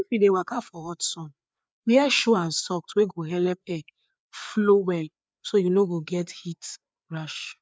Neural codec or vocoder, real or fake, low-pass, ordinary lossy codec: codec, 16 kHz, 4 kbps, FreqCodec, larger model; fake; none; none